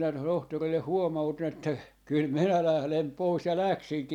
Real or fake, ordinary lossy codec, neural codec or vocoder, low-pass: real; none; none; 19.8 kHz